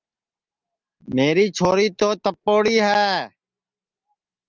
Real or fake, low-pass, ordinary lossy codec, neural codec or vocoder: real; 7.2 kHz; Opus, 32 kbps; none